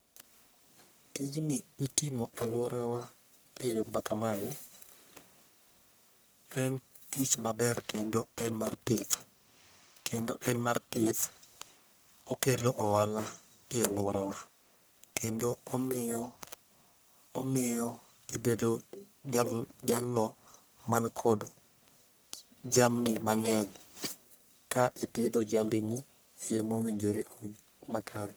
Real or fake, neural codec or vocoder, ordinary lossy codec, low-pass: fake; codec, 44.1 kHz, 1.7 kbps, Pupu-Codec; none; none